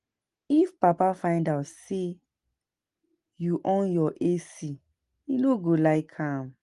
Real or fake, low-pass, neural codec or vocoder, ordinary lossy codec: real; 10.8 kHz; none; Opus, 24 kbps